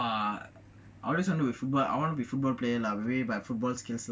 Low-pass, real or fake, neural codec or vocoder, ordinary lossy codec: none; real; none; none